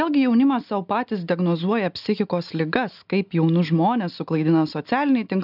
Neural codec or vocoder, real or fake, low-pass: none; real; 5.4 kHz